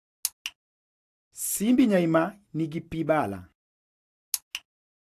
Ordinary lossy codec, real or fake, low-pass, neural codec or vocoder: AAC, 64 kbps; real; 14.4 kHz; none